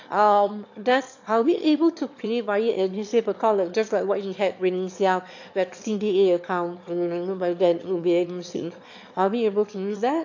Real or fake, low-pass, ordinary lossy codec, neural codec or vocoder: fake; 7.2 kHz; AAC, 48 kbps; autoencoder, 22.05 kHz, a latent of 192 numbers a frame, VITS, trained on one speaker